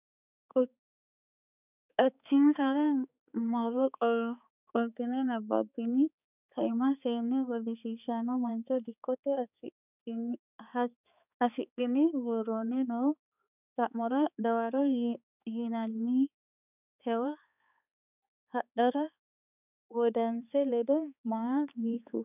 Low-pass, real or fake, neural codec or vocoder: 3.6 kHz; fake; codec, 16 kHz, 4 kbps, X-Codec, HuBERT features, trained on balanced general audio